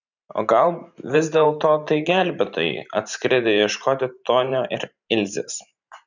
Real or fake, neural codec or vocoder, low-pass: fake; vocoder, 44.1 kHz, 128 mel bands every 512 samples, BigVGAN v2; 7.2 kHz